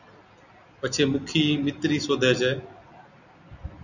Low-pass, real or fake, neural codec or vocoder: 7.2 kHz; real; none